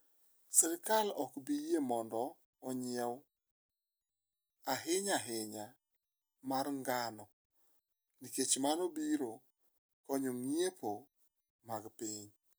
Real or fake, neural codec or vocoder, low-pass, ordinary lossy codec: real; none; none; none